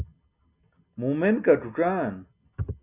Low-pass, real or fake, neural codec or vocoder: 3.6 kHz; real; none